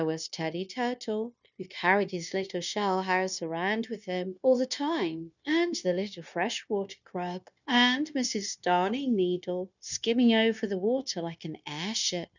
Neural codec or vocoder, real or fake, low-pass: codec, 16 kHz, 0.9 kbps, LongCat-Audio-Codec; fake; 7.2 kHz